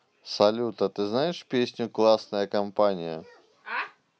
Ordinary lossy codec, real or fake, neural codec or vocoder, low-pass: none; real; none; none